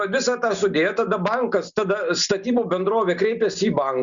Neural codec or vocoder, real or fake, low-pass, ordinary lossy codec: none; real; 7.2 kHz; Opus, 64 kbps